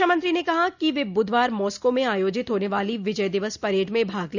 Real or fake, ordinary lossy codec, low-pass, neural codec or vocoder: real; none; 7.2 kHz; none